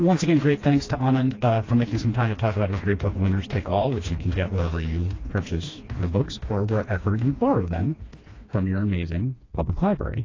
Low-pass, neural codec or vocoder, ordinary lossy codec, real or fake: 7.2 kHz; codec, 16 kHz, 2 kbps, FreqCodec, smaller model; AAC, 32 kbps; fake